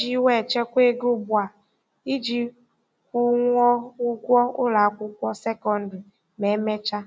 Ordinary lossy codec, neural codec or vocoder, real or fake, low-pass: none; none; real; none